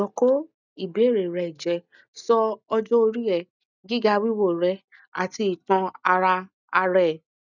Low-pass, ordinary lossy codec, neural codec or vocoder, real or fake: 7.2 kHz; none; none; real